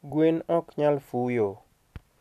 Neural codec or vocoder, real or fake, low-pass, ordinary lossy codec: none; real; 14.4 kHz; none